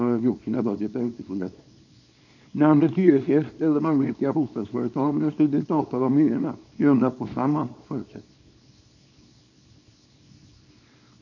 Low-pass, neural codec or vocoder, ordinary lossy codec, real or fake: 7.2 kHz; codec, 24 kHz, 0.9 kbps, WavTokenizer, small release; none; fake